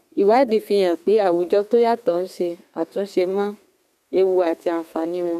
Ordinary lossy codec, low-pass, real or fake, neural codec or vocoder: MP3, 96 kbps; 14.4 kHz; fake; codec, 32 kHz, 1.9 kbps, SNAC